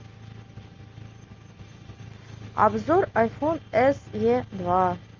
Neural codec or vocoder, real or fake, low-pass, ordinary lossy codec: none; real; 7.2 kHz; Opus, 32 kbps